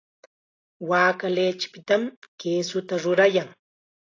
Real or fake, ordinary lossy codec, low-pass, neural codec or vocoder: real; AAC, 32 kbps; 7.2 kHz; none